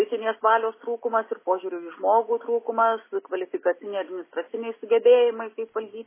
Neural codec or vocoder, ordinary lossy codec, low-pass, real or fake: none; MP3, 16 kbps; 3.6 kHz; real